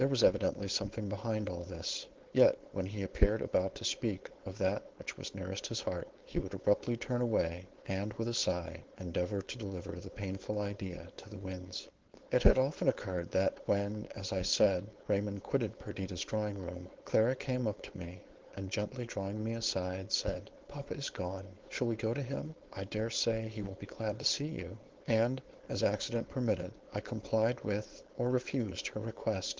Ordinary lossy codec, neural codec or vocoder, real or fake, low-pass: Opus, 16 kbps; codec, 16 kHz, 4.8 kbps, FACodec; fake; 7.2 kHz